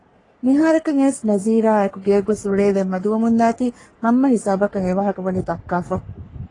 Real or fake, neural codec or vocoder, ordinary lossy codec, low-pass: fake; codec, 44.1 kHz, 3.4 kbps, Pupu-Codec; AAC, 32 kbps; 10.8 kHz